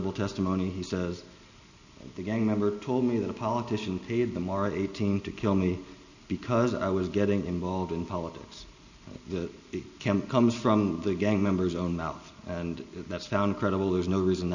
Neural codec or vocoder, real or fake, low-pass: none; real; 7.2 kHz